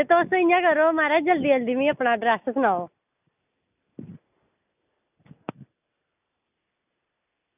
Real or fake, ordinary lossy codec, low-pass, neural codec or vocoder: real; none; 3.6 kHz; none